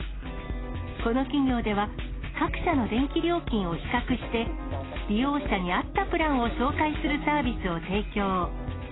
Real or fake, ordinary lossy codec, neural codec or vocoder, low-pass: real; AAC, 16 kbps; none; 7.2 kHz